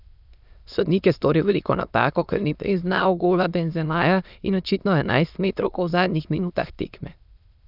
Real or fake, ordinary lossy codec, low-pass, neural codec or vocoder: fake; none; 5.4 kHz; autoencoder, 22.05 kHz, a latent of 192 numbers a frame, VITS, trained on many speakers